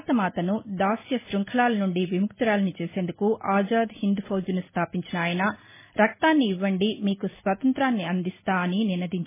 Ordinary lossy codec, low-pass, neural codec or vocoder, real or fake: MP3, 16 kbps; 3.6 kHz; none; real